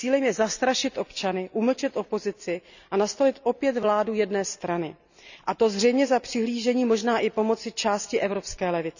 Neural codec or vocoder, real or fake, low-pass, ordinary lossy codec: none; real; 7.2 kHz; none